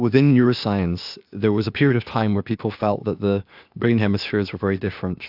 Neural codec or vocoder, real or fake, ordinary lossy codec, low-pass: codec, 16 kHz, 0.8 kbps, ZipCodec; fake; AAC, 48 kbps; 5.4 kHz